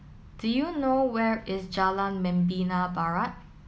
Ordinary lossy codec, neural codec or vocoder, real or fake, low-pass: none; none; real; none